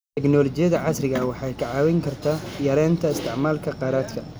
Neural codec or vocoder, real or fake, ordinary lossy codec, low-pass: none; real; none; none